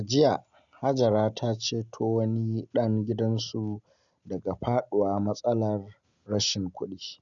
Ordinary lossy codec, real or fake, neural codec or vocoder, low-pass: none; real; none; 7.2 kHz